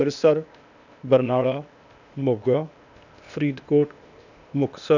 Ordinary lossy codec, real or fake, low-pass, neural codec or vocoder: none; fake; 7.2 kHz; codec, 16 kHz, 0.8 kbps, ZipCodec